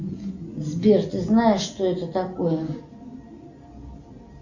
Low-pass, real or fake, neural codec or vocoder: 7.2 kHz; real; none